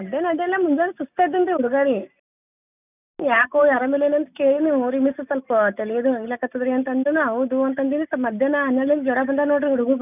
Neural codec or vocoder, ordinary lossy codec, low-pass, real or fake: none; none; 3.6 kHz; real